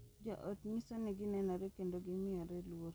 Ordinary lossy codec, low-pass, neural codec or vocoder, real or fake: none; none; none; real